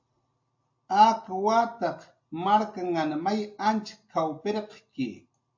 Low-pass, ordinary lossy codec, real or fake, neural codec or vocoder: 7.2 kHz; MP3, 48 kbps; real; none